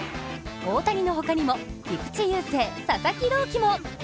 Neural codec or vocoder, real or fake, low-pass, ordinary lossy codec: none; real; none; none